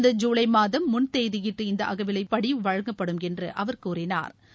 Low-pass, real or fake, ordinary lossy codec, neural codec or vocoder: none; real; none; none